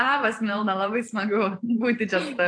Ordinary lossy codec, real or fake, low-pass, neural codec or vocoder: AAC, 48 kbps; fake; 9.9 kHz; vocoder, 44.1 kHz, 128 mel bands every 512 samples, BigVGAN v2